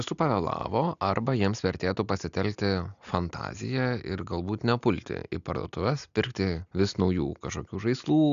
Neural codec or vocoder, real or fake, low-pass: none; real; 7.2 kHz